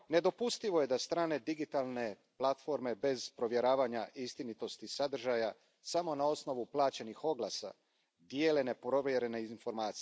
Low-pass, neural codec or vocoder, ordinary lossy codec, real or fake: none; none; none; real